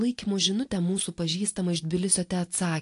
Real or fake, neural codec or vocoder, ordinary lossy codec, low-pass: real; none; AAC, 48 kbps; 10.8 kHz